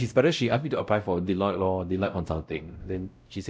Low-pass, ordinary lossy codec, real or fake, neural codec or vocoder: none; none; fake; codec, 16 kHz, 0.5 kbps, X-Codec, WavLM features, trained on Multilingual LibriSpeech